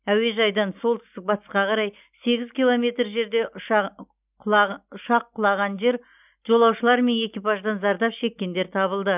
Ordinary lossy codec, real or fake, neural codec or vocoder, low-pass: none; real; none; 3.6 kHz